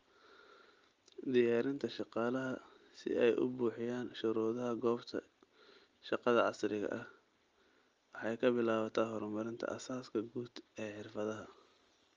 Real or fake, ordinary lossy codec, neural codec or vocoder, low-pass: real; Opus, 32 kbps; none; 7.2 kHz